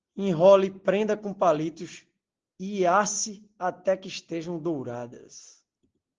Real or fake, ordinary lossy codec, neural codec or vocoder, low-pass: real; Opus, 16 kbps; none; 7.2 kHz